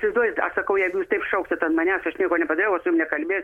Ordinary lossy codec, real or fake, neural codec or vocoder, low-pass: MP3, 64 kbps; real; none; 19.8 kHz